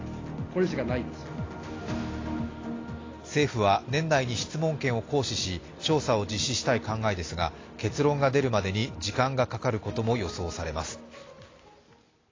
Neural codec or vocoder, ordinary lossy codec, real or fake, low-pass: none; AAC, 32 kbps; real; 7.2 kHz